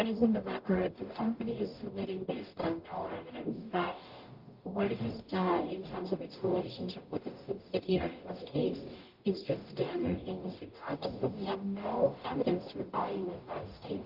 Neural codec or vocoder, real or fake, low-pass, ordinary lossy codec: codec, 44.1 kHz, 0.9 kbps, DAC; fake; 5.4 kHz; Opus, 32 kbps